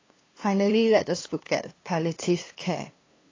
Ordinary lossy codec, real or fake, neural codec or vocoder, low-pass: AAC, 32 kbps; fake; codec, 16 kHz, 2 kbps, FunCodec, trained on LibriTTS, 25 frames a second; 7.2 kHz